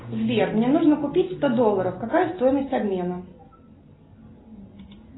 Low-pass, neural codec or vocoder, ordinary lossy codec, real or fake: 7.2 kHz; none; AAC, 16 kbps; real